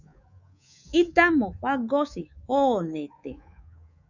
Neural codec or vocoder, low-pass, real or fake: codec, 24 kHz, 3.1 kbps, DualCodec; 7.2 kHz; fake